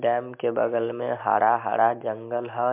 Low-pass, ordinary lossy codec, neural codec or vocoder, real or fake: 3.6 kHz; MP3, 32 kbps; none; real